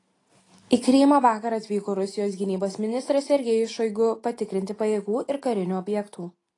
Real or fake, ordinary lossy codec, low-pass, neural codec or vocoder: real; AAC, 32 kbps; 10.8 kHz; none